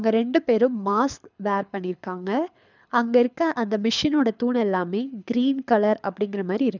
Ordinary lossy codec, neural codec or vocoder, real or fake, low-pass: none; codec, 24 kHz, 6 kbps, HILCodec; fake; 7.2 kHz